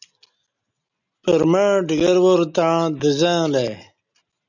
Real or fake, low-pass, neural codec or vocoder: real; 7.2 kHz; none